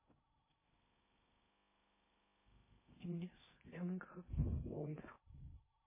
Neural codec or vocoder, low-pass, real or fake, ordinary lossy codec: codec, 16 kHz in and 24 kHz out, 0.6 kbps, FocalCodec, streaming, 4096 codes; 3.6 kHz; fake; MP3, 16 kbps